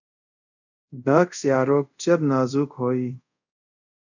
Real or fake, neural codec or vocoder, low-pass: fake; codec, 24 kHz, 0.5 kbps, DualCodec; 7.2 kHz